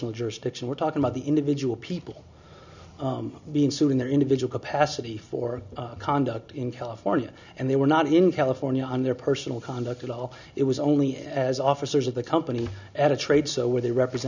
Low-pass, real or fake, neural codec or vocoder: 7.2 kHz; real; none